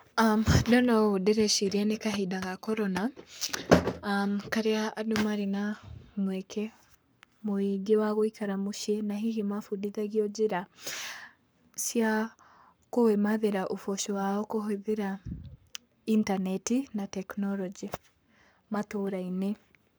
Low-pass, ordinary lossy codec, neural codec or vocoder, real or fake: none; none; codec, 44.1 kHz, 7.8 kbps, Pupu-Codec; fake